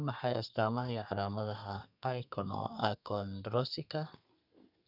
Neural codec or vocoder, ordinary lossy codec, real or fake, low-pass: codec, 32 kHz, 1.9 kbps, SNAC; none; fake; 5.4 kHz